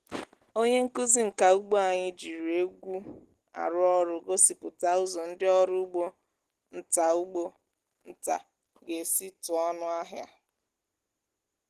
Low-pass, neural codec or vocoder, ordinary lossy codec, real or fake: 14.4 kHz; none; Opus, 16 kbps; real